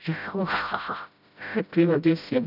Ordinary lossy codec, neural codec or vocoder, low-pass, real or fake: none; codec, 16 kHz, 0.5 kbps, FreqCodec, smaller model; 5.4 kHz; fake